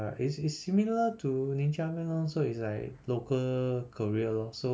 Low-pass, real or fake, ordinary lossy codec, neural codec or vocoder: none; real; none; none